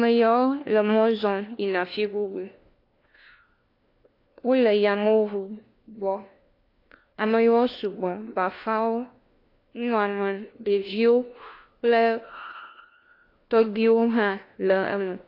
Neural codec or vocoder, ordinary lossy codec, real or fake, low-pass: codec, 16 kHz, 1 kbps, FunCodec, trained on LibriTTS, 50 frames a second; AAC, 32 kbps; fake; 5.4 kHz